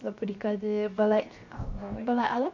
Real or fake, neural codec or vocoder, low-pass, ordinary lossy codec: fake; codec, 16 kHz, 0.7 kbps, FocalCodec; 7.2 kHz; MP3, 48 kbps